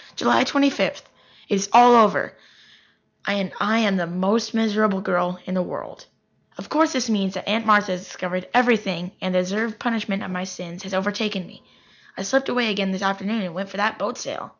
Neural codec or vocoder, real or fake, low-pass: none; real; 7.2 kHz